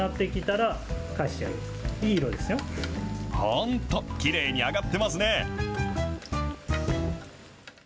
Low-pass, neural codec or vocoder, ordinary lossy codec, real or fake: none; none; none; real